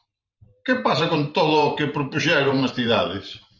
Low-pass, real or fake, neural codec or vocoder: 7.2 kHz; fake; vocoder, 44.1 kHz, 128 mel bands every 512 samples, BigVGAN v2